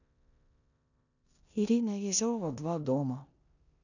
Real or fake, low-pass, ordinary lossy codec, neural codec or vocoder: fake; 7.2 kHz; none; codec, 16 kHz in and 24 kHz out, 0.9 kbps, LongCat-Audio-Codec, four codebook decoder